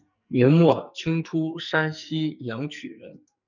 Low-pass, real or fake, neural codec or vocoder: 7.2 kHz; fake; codec, 44.1 kHz, 2.6 kbps, SNAC